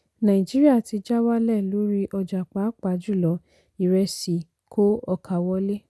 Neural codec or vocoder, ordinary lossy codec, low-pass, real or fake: none; none; none; real